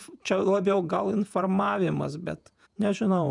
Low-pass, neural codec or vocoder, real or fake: 10.8 kHz; vocoder, 48 kHz, 128 mel bands, Vocos; fake